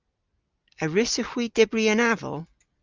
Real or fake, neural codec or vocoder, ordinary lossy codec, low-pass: real; none; Opus, 24 kbps; 7.2 kHz